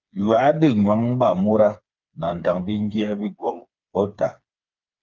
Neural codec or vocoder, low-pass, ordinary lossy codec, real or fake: codec, 16 kHz, 4 kbps, FreqCodec, smaller model; 7.2 kHz; Opus, 24 kbps; fake